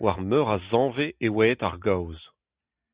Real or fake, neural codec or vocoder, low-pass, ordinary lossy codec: real; none; 3.6 kHz; Opus, 64 kbps